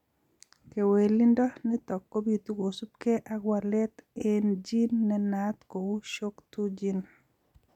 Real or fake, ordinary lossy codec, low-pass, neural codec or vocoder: real; none; 19.8 kHz; none